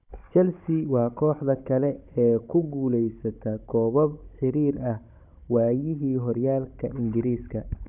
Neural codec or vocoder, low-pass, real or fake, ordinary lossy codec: codec, 16 kHz, 16 kbps, FunCodec, trained on Chinese and English, 50 frames a second; 3.6 kHz; fake; none